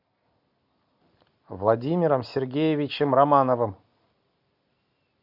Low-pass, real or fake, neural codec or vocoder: 5.4 kHz; real; none